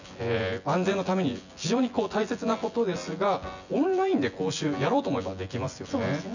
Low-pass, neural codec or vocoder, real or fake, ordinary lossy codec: 7.2 kHz; vocoder, 24 kHz, 100 mel bands, Vocos; fake; none